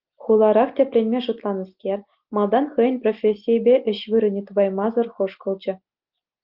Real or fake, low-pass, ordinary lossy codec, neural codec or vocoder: real; 5.4 kHz; Opus, 24 kbps; none